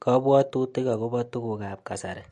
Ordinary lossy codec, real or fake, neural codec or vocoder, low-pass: MP3, 48 kbps; real; none; 14.4 kHz